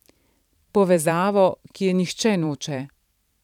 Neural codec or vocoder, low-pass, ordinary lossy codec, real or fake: vocoder, 44.1 kHz, 128 mel bands every 512 samples, BigVGAN v2; 19.8 kHz; none; fake